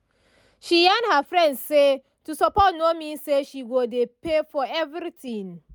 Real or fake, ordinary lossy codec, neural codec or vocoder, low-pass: real; none; none; none